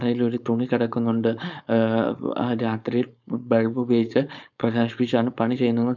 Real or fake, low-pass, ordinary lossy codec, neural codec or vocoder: fake; 7.2 kHz; none; codec, 16 kHz, 4.8 kbps, FACodec